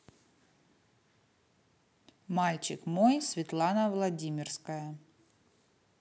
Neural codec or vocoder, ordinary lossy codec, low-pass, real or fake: none; none; none; real